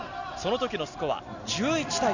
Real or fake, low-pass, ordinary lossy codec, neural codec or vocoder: real; 7.2 kHz; none; none